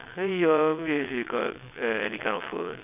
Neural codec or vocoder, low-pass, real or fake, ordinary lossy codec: vocoder, 22.05 kHz, 80 mel bands, WaveNeXt; 3.6 kHz; fake; none